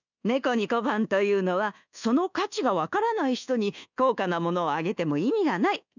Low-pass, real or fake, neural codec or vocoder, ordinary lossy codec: 7.2 kHz; fake; codec, 24 kHz, 1.2 kbps, DualCodec; none